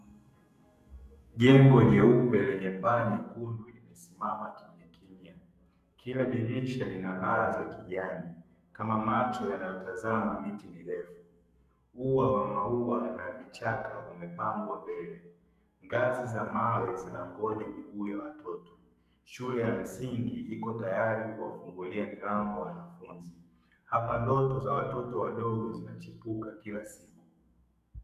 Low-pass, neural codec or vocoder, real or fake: 14.4 kHz; codec, 44.1 kHz, 2.6 kbps, SNAC; fake